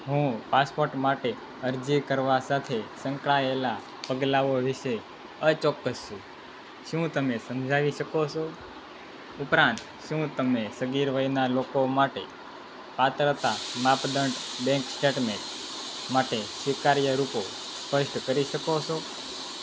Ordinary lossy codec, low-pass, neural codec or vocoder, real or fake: none; none; none; real